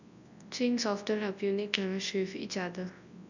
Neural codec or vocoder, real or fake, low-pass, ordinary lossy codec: codec, 24 kHz, 0.9 kbps, WavTokenizer, large speech release; fake; 7.2 kHz; none